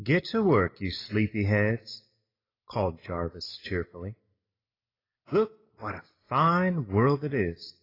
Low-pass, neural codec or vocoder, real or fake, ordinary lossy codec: 5.4 kHz; none; real; AAC, 24 kbps